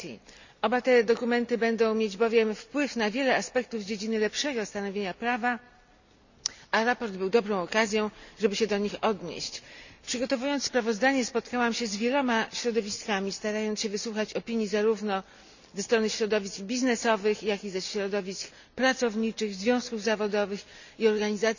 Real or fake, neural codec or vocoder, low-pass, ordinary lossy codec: real; none; 7.2 kHz; none